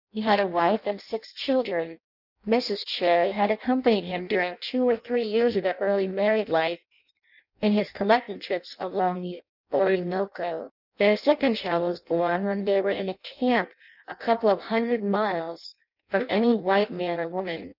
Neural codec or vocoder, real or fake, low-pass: codec, 16 kHz in and 24 kHz out, 0.6 kbps, FireRedTTS-2 codec; fake; 5.4 kHz